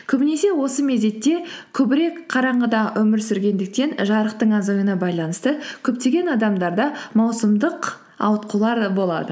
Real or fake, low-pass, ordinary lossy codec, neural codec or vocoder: real; none; none; none